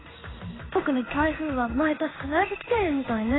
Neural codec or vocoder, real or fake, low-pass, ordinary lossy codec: codec, 16 kHz in and 24 kHz out, 1 kbps, XY-Tokenizer; fake; 7.2 kHz; AAC, 16 kbps